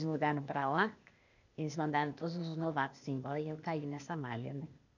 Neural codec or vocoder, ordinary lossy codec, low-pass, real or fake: codec, 16 kHz, 0.8 kbps, ZipCodec; none; 7.2 kHz; fake